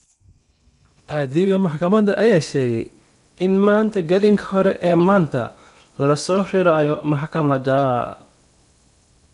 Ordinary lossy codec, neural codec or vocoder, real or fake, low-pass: none; codec, 16 kHz in and 24 kHz out, 0.8 kbps, FocalCodec, streaming, 65536 codes; fake; 10.8 kHz